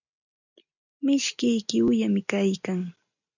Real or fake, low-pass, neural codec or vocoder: real; 7.2 kHz; none